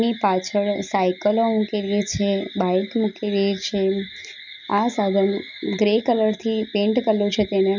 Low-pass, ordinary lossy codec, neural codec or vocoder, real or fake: 7.2 kHz; none; none; real